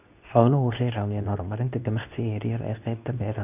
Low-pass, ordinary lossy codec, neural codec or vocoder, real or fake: 3.6 kHz; none; codec, 24 kHz, 0.9 kbps, WavTokenizer, medium speech release version 2; fake